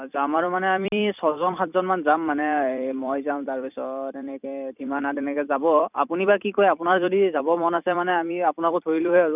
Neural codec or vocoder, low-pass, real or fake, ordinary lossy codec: vocoder, 44.1 kHz, 128 mel bands every 256 samples, BigVGAN v2; 3.6 kHz; fake; none